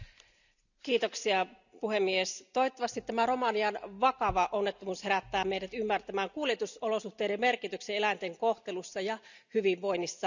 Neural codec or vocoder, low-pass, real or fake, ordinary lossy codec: none; 7.2 kHz; real; none